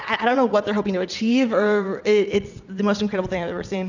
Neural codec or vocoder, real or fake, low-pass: vocoder, 22.05 kHz, 80 mel bands, WaveNeXt; fake; 7.2 kHz